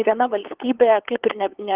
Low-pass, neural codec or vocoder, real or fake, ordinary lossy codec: 3.6 kHz; codec, 16 kHz, 8 kbps, FunCodec, trained on LibriTTS, 25 frames a second; fake; Opus, 16 kbps